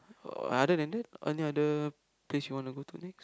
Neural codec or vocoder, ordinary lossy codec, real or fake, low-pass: none; none; real; none